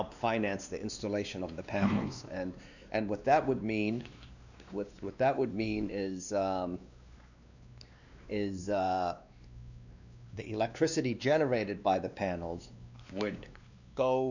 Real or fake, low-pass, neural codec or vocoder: fake; 7.2 kHz; codec, 16 kHz, 2 kbps, X-Codec, WavLM features, trained on Multilingual LibriSpeech